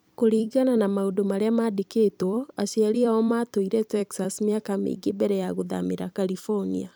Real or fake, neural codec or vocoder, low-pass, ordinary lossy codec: fake; vocoder, 44.1 kHz, 128 mel bands every 256 samples, BigVGAN v2; none; none